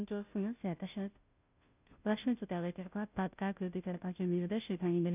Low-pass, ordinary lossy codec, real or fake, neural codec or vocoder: 3.6 kHz; none; fake; codec, 16 kHz, 0.5 kbps, FunCodec, trained on Chinese and English, 25 frames a second